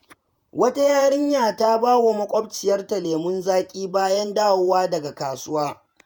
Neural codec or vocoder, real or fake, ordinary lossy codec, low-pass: vocoder, 48 kHz, 128 mel bands, Vocos; fake; none; none